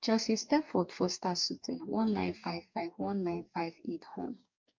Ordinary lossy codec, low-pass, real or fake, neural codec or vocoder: MP3, 64 kbps; 7.2 kHz; fake; codec, 44.1 kHz, 2.6 kbps, DAC